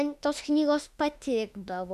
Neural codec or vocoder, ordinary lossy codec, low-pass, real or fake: autoencoder, 48 kHz, 32 numbers a frame, DAC-VAE, trained on Japanese speech; AAC, 96 kbps; 14.4 kHz; fake